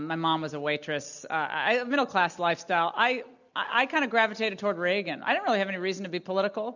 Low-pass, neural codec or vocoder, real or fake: 7.2 kHz; none; real